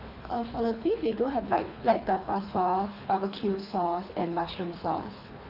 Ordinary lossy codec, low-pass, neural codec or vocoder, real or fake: none; 5.4 kHz; codec, 24 kHz, 3 kbps, HILCodec; fake